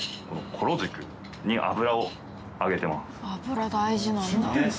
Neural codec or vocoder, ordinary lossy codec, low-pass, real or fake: none; none; none; real